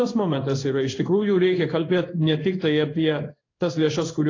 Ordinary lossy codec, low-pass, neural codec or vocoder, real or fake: AAC, 32 kbps; 7.2 kHz; codec, 16 kHz in and 24 kHz out, 1 kbps, XY-Tokenizer; fake